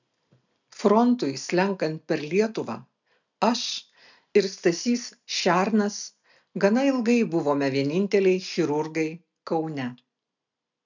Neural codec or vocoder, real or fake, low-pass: none; real; 7.2 kHz